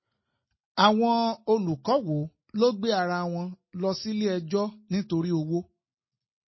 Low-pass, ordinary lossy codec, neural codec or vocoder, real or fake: 7.2 kHz; MP3, 24 kbps; none; real